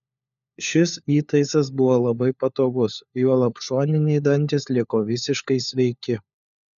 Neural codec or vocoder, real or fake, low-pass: codec, 16 kHz, 4 kbps, FunCodec, trained on LibriTTS, 50 frames a second; fake; 7.2 kHz